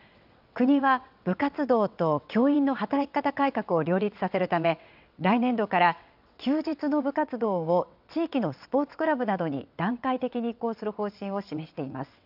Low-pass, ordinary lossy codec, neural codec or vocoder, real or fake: 5.4 kHz; none; vocoder, 22.05 kHz, 80 mel bands, WaveNeXt; fake